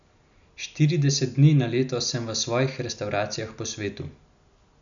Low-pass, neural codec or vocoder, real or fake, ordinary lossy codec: 7.2 kHz; none; real; none